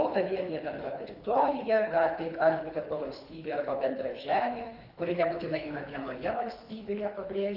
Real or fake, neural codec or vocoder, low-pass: fake; codec, 24 kHz, 3 kbps, HILCodec; 5.4 kHz